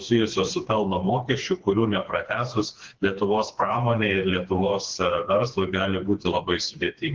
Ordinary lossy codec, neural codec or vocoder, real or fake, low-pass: Opus, 16 kbps; codec, 16 kHz, 2 kbps, FreqCodec, smaller model; fake; 7.2 kHz